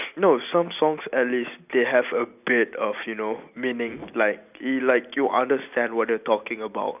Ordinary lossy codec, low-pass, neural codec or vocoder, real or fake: none; 3.6 kHz; none; real